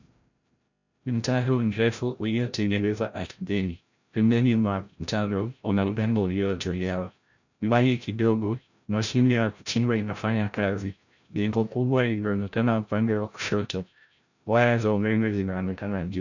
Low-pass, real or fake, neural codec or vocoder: 7.2 kHz; fake; codec, 16 kHz, 0.5 kbps, FreqCodec, larger model